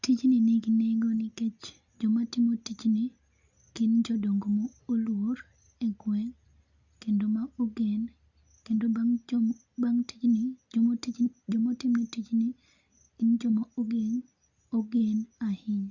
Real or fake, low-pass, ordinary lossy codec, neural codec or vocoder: real; 7.2 kHz; Opus, 64 kbps; none